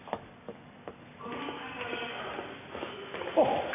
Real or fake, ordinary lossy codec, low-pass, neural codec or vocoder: real; AAC, 24 kbps; 3.6 kHz; none